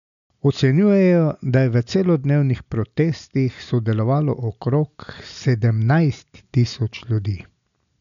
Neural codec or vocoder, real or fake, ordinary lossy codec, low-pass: none; real; none; 7.2 kHz